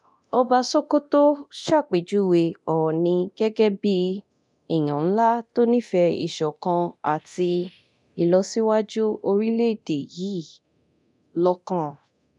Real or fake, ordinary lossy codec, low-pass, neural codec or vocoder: fake; none; 10.8 kHz; codec, 24 kHz, 0.9 kbps, DualCodec